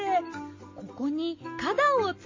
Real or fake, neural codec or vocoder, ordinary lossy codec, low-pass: real; none; MP3, 32 kbps; 7.2 kHz